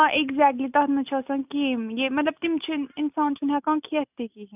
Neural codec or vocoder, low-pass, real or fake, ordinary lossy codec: none; 3.6 kHz; real; none